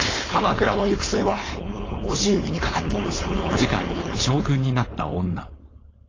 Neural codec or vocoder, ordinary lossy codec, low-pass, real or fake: codec, 16 kHz, 4.8 kbps, FACodec; AAC, 32 kbps; 7.2 kHz; fake